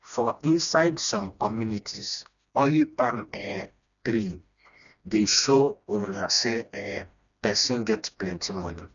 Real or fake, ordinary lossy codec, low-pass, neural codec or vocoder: fake; none; 7.2 kHz; codec, 16 kHz, 1 kbps, FreqCodec, smaller model